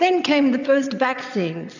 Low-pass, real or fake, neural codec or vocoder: 7.2 kHz; fake; vocoder, 22.05 kHz, 80 mel bands, WaveNeXt